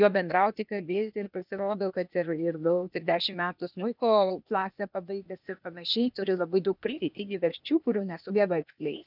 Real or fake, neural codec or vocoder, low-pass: fake; codec, 16 kHz, 0.8 kbps, ZipCodec; 5.4 kHz